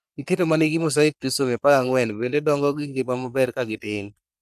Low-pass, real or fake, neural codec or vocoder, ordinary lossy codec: 14.4 kHz; fake; codec, 44.1 kHz, 3.4 kbps, Pupu-Codec; none